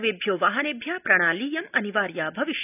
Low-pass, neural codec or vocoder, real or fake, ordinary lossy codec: 3.6 kHz; none; real; none